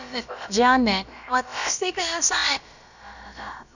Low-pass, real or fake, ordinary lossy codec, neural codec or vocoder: 7.2 kHz; fake; none; codec, 16 kHz, about 1 kbps, DyCAST, with the encoder's durations